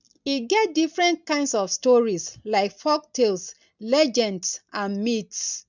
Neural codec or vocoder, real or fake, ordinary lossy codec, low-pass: none; real; none; 7.2 kHz